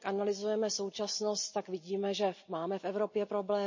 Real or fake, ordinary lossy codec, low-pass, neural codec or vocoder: real; MP3, 32 kbps; 7.2 kHz; none